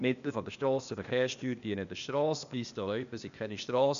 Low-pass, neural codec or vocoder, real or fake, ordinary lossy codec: 7.2 kHz; codec, 16 kHz, 0.8 kbps, ZipCodec; fake; MP3, 96 kbps